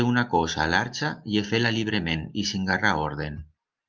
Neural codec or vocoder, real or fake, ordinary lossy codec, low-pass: none; real; Opus, 24 kbps; 7.2 kHz